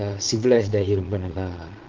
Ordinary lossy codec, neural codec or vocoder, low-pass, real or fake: Opus, 16 kbps; vocoder, 22.05 kHz, 80 mel bands, Vocos; 7.2 kHz; fake